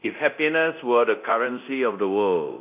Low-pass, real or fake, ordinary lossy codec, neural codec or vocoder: 3.6 kHz; fake; AAC, 32 kbps; codec, 24 kHz, 0.9 kbps, DualCodec